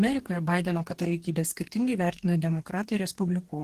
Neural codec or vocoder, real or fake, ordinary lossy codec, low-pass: codec, 44.1 kHz, 2.6 kbps, DAC; fake; Opus, 16 kbps; 14.4 kHz